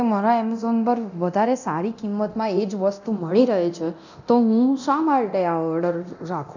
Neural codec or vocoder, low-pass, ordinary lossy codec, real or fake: codec, 24 kHz, 0.9 kbps, DualCodec; 7.2 kHz; none; fake